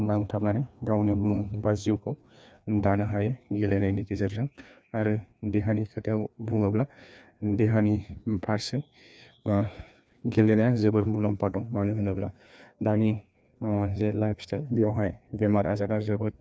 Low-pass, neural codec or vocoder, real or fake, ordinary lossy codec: none; codec, 16 kHz, 2 kbps, FreqCodec, larger model; fake; none